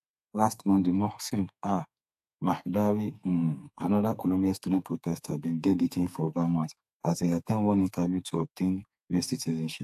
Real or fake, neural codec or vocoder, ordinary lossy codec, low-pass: fake; codec, 32 kHz, 1.9 kbps, SNAC; AAC, 96 kbps; 14.4 kHz